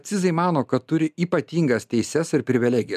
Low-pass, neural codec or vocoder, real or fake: 14.4 kHz; none; real